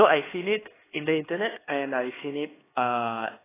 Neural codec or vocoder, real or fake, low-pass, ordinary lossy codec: codec, 16 kHz, 2 kbps, X-Codec, HuBERT features, trained on LibriSpeech; fake; 3.6 kHz; AAC, 16 kbps